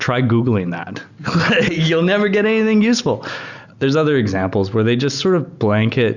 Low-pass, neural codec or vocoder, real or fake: 7.2 kHz; none; real